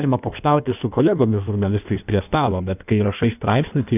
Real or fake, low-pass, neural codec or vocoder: fake; 3.6 kHz; codec, 16 kHz in and 24 kHz out, 1.1 kbps, FireRedTTS-2 codec